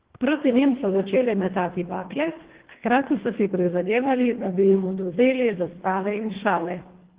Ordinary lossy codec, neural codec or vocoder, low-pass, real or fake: Opus, 16 kbps; codec, 24 kHz, 1.5 kbps, HILCodec; 3.6 kHz; fake